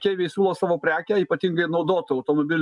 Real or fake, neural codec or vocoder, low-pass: real; none; 10.8 kHz